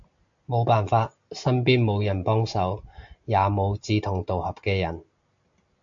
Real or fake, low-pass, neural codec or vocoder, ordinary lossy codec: real; 7.2 kHz; none; MP3, 64 kbps